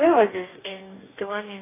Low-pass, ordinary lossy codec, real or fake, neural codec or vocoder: 3.6 kHz; AAC, 16 kbps; fake; codec, 44.1 kHz, 2.6 kbps, DAC